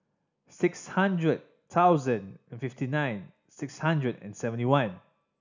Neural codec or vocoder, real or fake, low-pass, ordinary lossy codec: none; real; 7.2 kHz; none